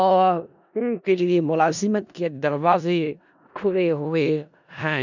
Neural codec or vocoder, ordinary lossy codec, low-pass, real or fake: codec, 16 kHz in and 24 kHz out, 0.4 kbps, LongCat-Audio-Codec, four codebook decoder; none; 7.2 kHz; fake